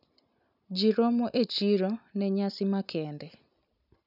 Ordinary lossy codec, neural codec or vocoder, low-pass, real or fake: none; none; 5.4 kHz; real